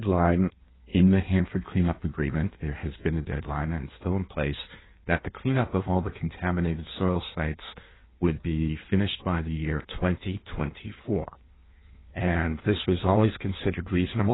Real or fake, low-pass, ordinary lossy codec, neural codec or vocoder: fake; 7.2 kHz; AAC, 16 kbps; codec, 16 kHz in and 24 kHz out, 1.1 kbps, FireRedTTS-2 codec